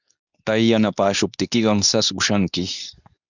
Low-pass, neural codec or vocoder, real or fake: 7.2 kHz; codec, 24 kHz, 0.9 kbps, WavTokenizer, medium speech release version 2; fake